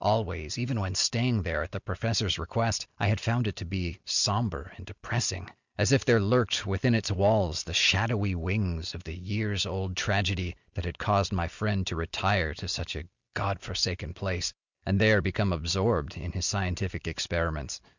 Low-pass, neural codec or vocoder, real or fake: 7.2 kHz; none; real